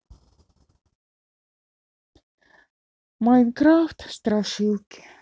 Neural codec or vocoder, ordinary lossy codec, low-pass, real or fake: none; none; none; real